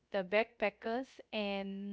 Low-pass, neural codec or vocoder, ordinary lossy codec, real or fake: none; codec, 16 kHz, 0.3 kbps, FocalCodec; none; fake